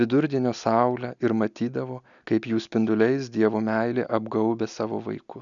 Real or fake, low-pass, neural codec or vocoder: real; 7.2 kHz; none